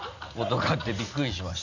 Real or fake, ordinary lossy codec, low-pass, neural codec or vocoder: real; none; 7.2 kHz; none